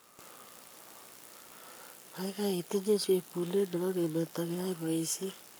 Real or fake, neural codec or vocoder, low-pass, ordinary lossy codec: fake; codec, 44.1 kHz, 7.8 kbps, Pupu-Codec; none; none